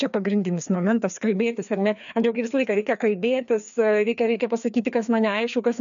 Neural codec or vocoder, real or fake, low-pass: codec, 16 kHz, 2 kbps, FreqCodec, larger model; fake; 7.2 kHz